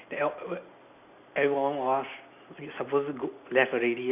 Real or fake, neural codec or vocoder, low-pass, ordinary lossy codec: real; none; 3.6 kHz; none